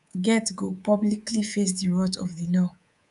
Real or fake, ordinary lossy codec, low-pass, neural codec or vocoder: fake; none; 10.8 kHz; codec, 24 kHz, 3.1 kbps, DualCodec